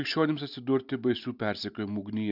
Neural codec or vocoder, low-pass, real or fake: none; 5.4 kHz; real